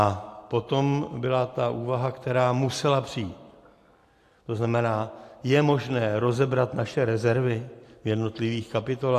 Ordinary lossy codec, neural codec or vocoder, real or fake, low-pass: AAC, 64 kbps; none; real; 14.4 kHz